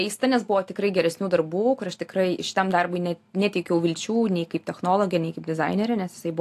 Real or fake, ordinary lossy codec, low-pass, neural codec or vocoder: real; AAC, 64 kbps; 14.4 kHz; none